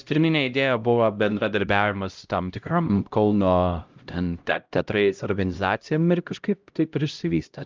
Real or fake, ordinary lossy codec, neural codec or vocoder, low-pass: fake; Opus, 24 kbps; codec, 16 kHz, 0.5 kbps, X-Codec, HuBERT features, trained on LibriSpeech; 7.2 kHz